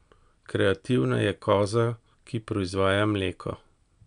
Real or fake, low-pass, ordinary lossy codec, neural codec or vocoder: real; 9.9 kHz; none; none